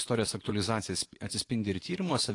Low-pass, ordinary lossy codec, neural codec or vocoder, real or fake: 10.8 kHz; AAC, 48 kbps; vocoder, 24 kHz, 100 mel bands, Vocos; fake